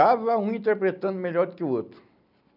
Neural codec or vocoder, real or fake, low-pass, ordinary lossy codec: none; real; 5.4 kHz; none